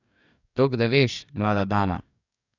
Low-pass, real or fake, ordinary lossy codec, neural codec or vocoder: 7.2 kHz; fake; none; codec, 44.1 kHz, 2.6 kbps, DAC